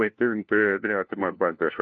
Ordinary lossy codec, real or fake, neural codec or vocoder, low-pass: AAC, 48 kbps; fake; codec, 16 kHz, 1 kbps, FunCodec, trained on LibriTTS, 50 frames a second; 7.2 kHz